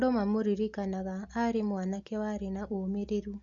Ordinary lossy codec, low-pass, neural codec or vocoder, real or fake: Opus, 64 kbps; 7.2 kHz; none; real